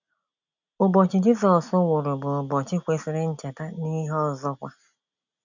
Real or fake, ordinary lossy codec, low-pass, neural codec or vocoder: real; none; 7.2 kHz; none